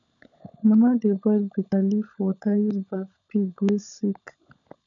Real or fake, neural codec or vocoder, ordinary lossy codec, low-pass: fake; codec, 16 kHz, 16 kbps, FunCodec, trained on LibriTTS, 50 frames a second; none; 7.2 kHz